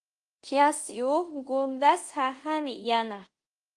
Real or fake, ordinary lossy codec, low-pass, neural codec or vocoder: fake; Opus, 24 kbps; 10.8 kHz; codec, 24 kHz, 0.5 kbps, DualCodec